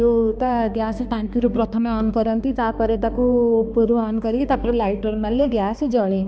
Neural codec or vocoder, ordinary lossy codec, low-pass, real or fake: codec, 16 kHz, 2 kbps, X-Codec, HuBERT features, trained on balanced general audio; none; none; fake